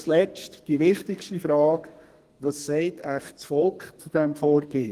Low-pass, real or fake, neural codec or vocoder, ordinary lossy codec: 14.4 kHz; fake; codec, 32 kHz, 1.9 kbps, SNAC; Opus, 24 kbps